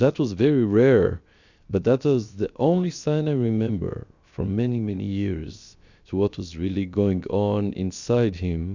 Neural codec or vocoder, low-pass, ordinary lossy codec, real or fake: codec, 16 kHz, 0.7 kbps, FocalCodec; 7.2 kHz; Opus, 64 kbps; fake